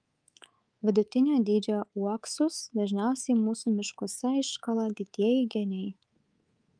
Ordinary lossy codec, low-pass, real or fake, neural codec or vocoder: Opus, 32 kbps; 9.9 kHz; fake; codec, 24 kHz, 3.1 kbps, DualCodec